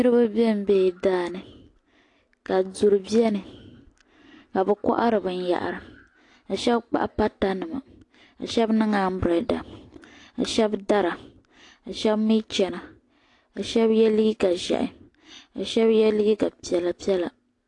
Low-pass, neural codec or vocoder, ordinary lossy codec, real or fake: 10.8 kHz; none; AAC, 48 kbps; real